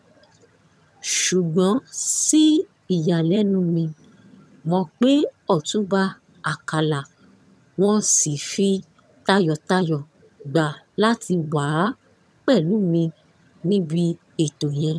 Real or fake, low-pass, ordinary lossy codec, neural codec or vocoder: fake; none; none; vocoder, 22.05 kHz, 80 mel bands, HiFi-GAN